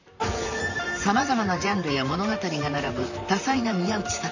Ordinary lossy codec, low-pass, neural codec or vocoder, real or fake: none; 7.2 kHz; vocoder, 44.1 kHz, 128 mel bands, Pupu-Vocoder; fake